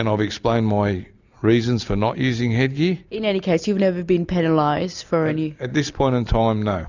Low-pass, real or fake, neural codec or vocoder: 7.2 kHz; real; none